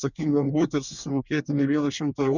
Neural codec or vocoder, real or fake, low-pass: codec, 24 kHz, 1 kbps, SNAC; fake; 7.2 kHz